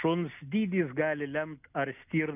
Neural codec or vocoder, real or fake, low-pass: none; real; 3.6 kHz